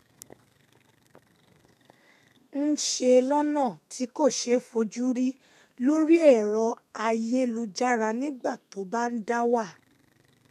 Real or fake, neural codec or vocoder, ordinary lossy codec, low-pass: fake; codec, 32 kHz, 1.9 kbps, SNAC; none; 14.4 kHz